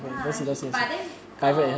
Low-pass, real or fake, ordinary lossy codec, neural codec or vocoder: none; real; none; none